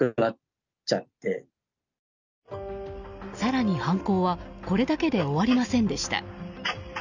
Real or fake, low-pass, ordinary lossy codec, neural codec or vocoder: real; 7.2 kHz; none; none